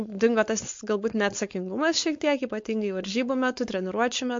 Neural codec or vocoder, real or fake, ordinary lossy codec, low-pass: codec, 16 kHz, 4.8 kbps, FACodec; fake; MP3, 48 kbps; 7.2 kHz